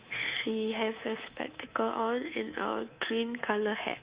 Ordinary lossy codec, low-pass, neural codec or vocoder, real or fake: Opus, 64 kbps; 3.6 kHz; codec, 24 kHz, 3.1 kbps, DualCodec; fake